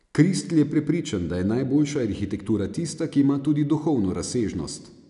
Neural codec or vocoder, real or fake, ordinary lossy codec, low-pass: none; real; none; 10.8 kHz